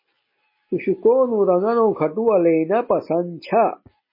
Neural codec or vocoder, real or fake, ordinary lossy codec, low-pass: none; real; MP3, 24 kbps; 5.4 kHz